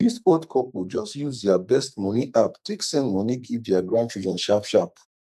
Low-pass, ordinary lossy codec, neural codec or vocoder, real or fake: 14.4 kHz; none; codec, 44.1 kHz, 2.6 kbps, SNAC; fake